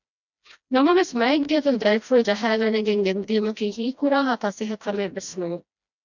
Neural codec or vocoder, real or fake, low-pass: codec, 16 kHz, 1 kbps, FreqCodec, smaller model; fake; 7.2 kHz